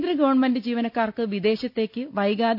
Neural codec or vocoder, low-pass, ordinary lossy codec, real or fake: none; 5.4 kHz; none; real